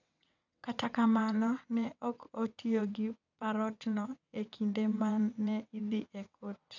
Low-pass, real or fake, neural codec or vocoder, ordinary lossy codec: 7.2 kHz; fake; vocoder, 22.05 kHz, 80 mel bands, WaveNeXt; none